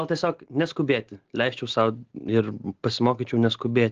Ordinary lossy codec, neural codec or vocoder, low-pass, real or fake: Opus, 16 kbps; none; 7.2 kHz; real